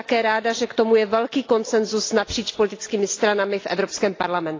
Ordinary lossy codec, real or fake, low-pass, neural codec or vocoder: AAC, 32 kbps; real; 7.2 kHz; none